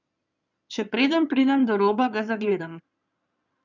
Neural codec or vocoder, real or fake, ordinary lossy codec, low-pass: codec, 16 kHz in and 24 kHz out, 2.2 kbps, FireRedTTS-2 codec; fake; none; 7.2 kHz